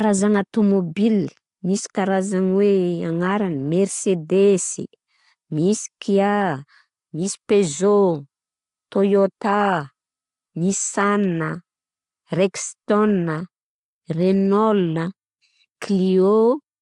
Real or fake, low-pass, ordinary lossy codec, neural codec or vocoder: real; 10.8 kHz; AAC, 48 kbps; none